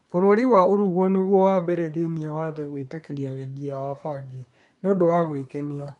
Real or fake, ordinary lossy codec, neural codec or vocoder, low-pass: fake; none; codec, 24 kHz, 1 kbps, SNAC; 10.8 kHz